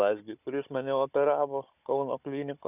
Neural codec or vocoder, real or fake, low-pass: codec, 16 kHz, 16 kbps, FunCodec, trained on Chinese and English, 50 frames a second; fake; 3.6 kHz